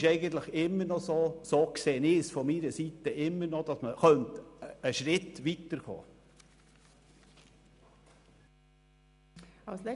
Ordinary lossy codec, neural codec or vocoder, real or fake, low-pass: none; none; real; 10.8 kHz